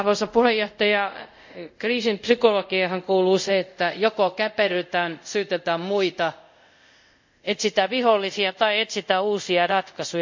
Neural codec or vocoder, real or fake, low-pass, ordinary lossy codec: codec, 24 kHz, 0.5 kbps, DualCodec; fake; 7.2 kHz; MP3, 64 kbps